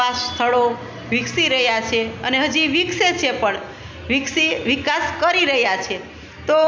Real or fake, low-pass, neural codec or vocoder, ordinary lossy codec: real; none; none; none